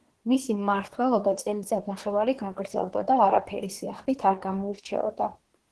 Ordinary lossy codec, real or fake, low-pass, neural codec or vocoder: Opus, 16 kbps; fake; 10.8 kHz; codec, 24 kHz, 1 kbps, SNAC